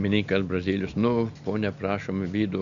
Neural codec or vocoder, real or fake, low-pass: none; real; 7.2 kHz